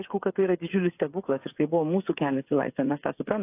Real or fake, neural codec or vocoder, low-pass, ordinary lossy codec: fake; codec, 16 kHz, 8 kbps, FreqCodec, smaller model; 3.6 kHz; AAC, 32 kbps